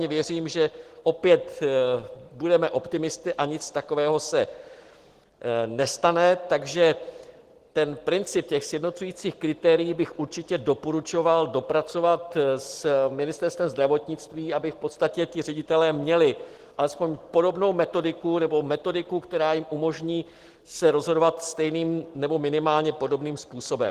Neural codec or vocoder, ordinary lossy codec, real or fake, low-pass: none; Opus, 16 kbps; real; 14.4 kHz